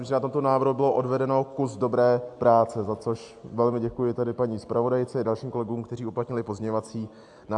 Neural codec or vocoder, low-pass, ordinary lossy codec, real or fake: none; 10.8 kHz; AAC, 64 kbps; real